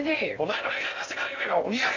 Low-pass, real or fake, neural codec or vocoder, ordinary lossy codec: 7.2 kHz; fake; codec, 16 kHz in and 24 kHz out, 0.6 kbps, FocalCodec, streaming, 2048 codes; AAC, 48 kbps